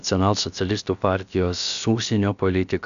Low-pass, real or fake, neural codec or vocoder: 7.2 kHz; fake; codec, 16 kHz, about 1 kbps, DyCAST, with the encoder's durations